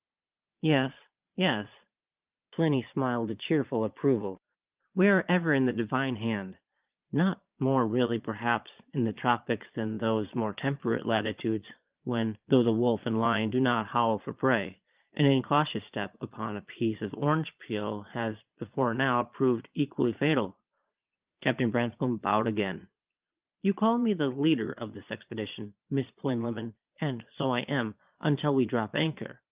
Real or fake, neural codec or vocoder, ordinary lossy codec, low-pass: fake; vocoder, 22.05 kHz, 80 mel bands, Vocos; Opus, 32 kbps; 3.6 kHz